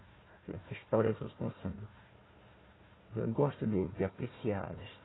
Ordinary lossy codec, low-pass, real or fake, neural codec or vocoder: AAC, 16 kbps; 7.2 kHz; fake; codec, 16 kHz, 1 kbps, FunCodec, trained on Chinese and English, 50 frames a second